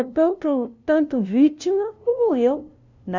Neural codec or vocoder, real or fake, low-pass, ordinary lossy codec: codec, 16 kHz, 0.5 kbps, FunCodec, trained on LibriTTS, 25 frames a second; fake; 7.2 kHz; none